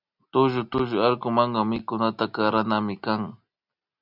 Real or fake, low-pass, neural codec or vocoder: real; 5.4 kHz; none